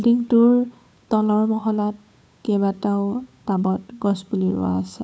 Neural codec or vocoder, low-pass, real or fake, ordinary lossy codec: codec, 16 kHz, 8 kbps, FunCodec, trained on Chinese and English, 25 frames a second; none; fake; none